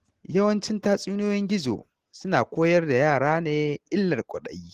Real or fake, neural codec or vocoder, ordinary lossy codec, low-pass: real; none; Opus, 16 kbps; 14.4 kHz